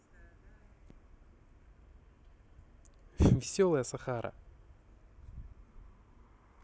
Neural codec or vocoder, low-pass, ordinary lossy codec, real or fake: none; none; none; real